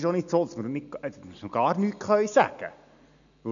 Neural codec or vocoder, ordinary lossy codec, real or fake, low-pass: none; none; real; 7.2 kHz